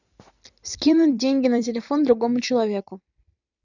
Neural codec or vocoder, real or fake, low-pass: vocoder, 44.1 kHz, 128 mel bands every 256 samples, BigVGAN v2; fake; 7.2 kHz